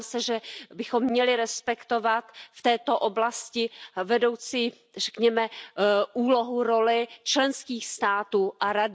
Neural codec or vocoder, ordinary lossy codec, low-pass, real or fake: none; none; none; real